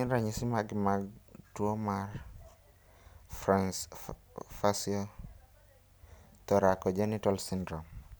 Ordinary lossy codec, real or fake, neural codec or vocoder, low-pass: none; real; none; none